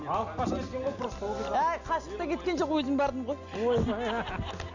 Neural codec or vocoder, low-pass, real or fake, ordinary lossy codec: none; 7.2 kHz; real; none